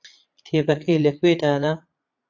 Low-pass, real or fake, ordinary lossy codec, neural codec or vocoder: 7.2 kHz; fake; AAC, 48 kbps; vocoder, 22.05 kHz, 80 mel bands, Vocos